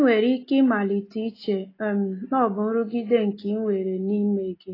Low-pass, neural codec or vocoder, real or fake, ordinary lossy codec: 5.4 kHz; none; real; AAC, 24 kbps